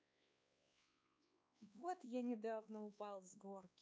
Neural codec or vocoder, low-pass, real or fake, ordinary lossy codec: codec, 16 kHz, 2 kbps, X-Codec, WavLM features, trained on Multilingual LibriSpeech; none; fake; none